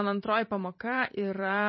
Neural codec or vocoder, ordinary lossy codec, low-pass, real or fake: vocoder, 44.1 kHz, 128 mel bands every 512 samples, BigVGAN v2; MP3, 24 kbps; 7.2 kHz; fake